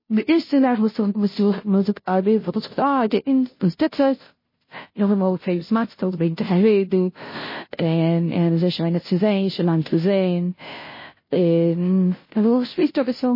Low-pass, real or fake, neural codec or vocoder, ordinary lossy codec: 5.4 kHz; fake; codec, 16 kHz, 0.5 kbps, FunCodec, trained on Chinese and English, 25 frames a second; MP3, 24 kbps